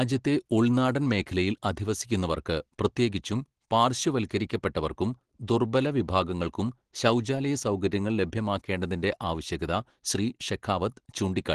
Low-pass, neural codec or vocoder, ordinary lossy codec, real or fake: 10.8 kHz; none; Opus, 16 kbps; real